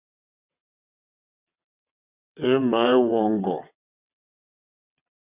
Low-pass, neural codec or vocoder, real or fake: 3.6 kHz; vocoder, 22.05 kHz, 80 mel bands, WaveNeXt; fake